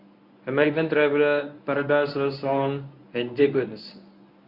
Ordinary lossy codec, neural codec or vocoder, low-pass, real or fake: Opus, 64 kbps; codec, 24 kHz, 0.9 kbps, WavTokenizer, medium speech release version 1; 5.4 kHz; fake